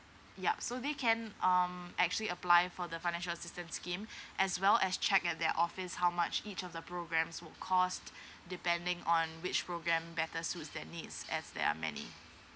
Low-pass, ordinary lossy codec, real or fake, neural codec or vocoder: none; none; real; none